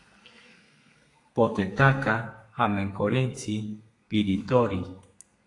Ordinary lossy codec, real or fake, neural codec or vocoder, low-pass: AAC, 48 kbps; fake; codec, 32 kHz, 1.9 kbps, SNAC; 10.8 kHz